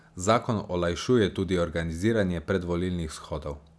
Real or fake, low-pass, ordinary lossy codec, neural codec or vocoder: real; none; none; none